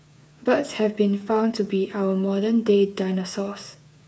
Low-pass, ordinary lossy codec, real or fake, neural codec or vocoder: none; none; fake; codec, 16 kHz, 8 kbps, FreqCodec, smaller model